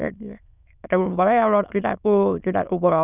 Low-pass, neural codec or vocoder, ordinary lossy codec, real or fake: 3.6 kHz; autoencoder, 22.05 kHz, a latent of 192 numbers a frame, VITS, trained on many speakers; none; fake